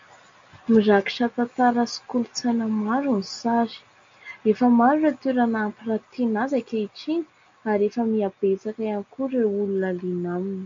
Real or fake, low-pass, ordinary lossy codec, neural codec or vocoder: real; 7.2 kHz; MP3, 48 kbps; none